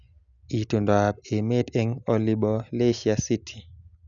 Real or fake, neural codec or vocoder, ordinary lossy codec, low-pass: real; none; none; 7.2 kHz